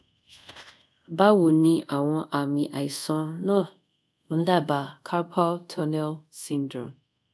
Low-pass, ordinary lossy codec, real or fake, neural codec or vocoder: none; none; fake; codec, 24 kHz, 0.5 kbps, DualCodec